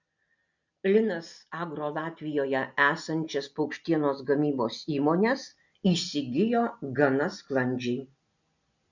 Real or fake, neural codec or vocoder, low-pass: real; none; 7.2 kHz